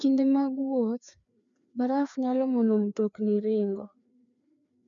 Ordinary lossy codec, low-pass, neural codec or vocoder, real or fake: none; 7.2 kHz; codec, 16 kHz, 2 kbps, FreqCodec, larger model; fake